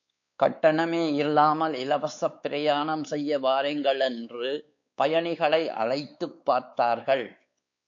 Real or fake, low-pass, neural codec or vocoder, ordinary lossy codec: fake; 7.2 kHz; codec, 16 kHz, 4 kbps, X-Codec, WavLM features, trained on Multilingual LibriSpeech; AAC, 64 kbps